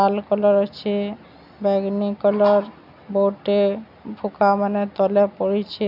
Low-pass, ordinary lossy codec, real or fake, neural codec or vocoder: 5.4 kHz; none; real; none